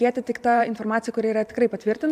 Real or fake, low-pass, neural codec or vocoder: fake; 14.4 kHz; vocoder, 44.1 kHz, 128 mel bands every 512 samples, BigVGAN v2